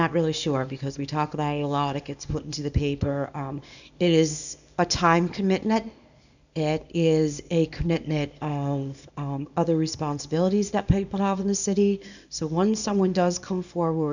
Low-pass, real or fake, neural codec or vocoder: 7.2 kHz; fake; codec, 24 kHz, 0.9 kbps, WavTokenizer, small release